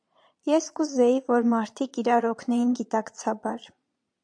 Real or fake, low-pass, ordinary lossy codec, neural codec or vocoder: fake; 9.9 kHz; AAC, 64 kbps; vocoder, 22.05 kHz, 80 mel bands, Vocos